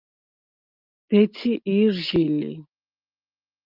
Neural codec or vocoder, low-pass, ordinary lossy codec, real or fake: none; 5.4 kHz; Opus, 32 kbps; real